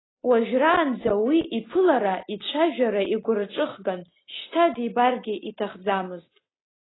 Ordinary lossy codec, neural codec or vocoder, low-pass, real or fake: AAC, 16 kbps; none; 7.2 kHz; real